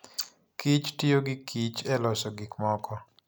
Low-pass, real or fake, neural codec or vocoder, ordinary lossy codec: none; real; none; none